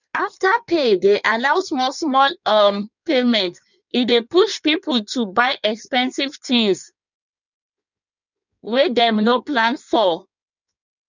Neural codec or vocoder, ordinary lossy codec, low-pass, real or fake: codec, 16 kHz in and 24 kHz out, 1.1 kbps, FireRedTTS-2 codec; none; 7.2 kHz; fake